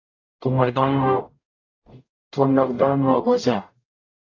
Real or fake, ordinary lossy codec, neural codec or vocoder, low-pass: fake; AAC, 32 kbps; codec, 44.1 kHz, 0.9 kbps, DAC; 7.2 kHz